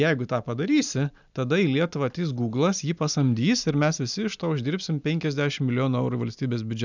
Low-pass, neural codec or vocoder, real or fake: 7.2 kHz; none; real